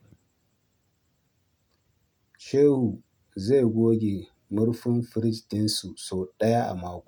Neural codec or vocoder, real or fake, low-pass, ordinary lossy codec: none; real; 19.8 kHz; none